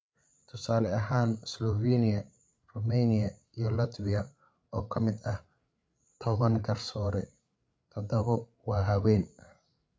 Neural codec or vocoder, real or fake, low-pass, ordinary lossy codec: codec, 16 kHz, 8 kbps, FreqCodec, larger model; fake; none; none